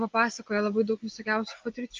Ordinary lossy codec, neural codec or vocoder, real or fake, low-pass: Opus, 24 kbps; none; real; 7.2 kHz